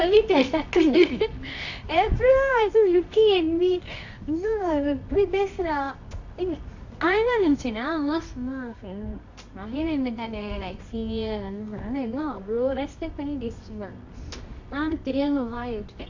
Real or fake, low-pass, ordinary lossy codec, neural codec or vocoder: fake; 7.2 kHz; AAC, 48 kbps; codec, 24 kHz, 0.9 kbps, WavTokenizer, medium music audio release